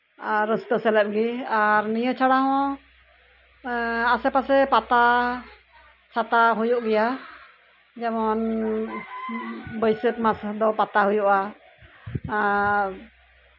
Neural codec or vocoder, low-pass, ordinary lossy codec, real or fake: none; 5.4 kHz; none; real